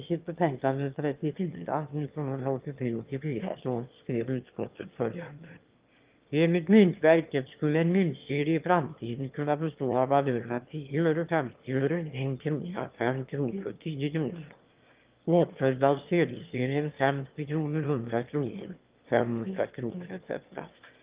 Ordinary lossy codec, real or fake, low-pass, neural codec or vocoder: Opus, 32 kbps; fake; 3.6 kHz; autoencoder, 22.05 kHz, a latent of 192 numbers a frame, VITS, trained on one speaker